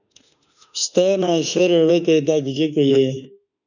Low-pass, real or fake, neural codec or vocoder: 7.2 kHz; fake; autoencoder, 48 kHz, 32 numbers a frame, DAC-VAE, trained on Japanese speech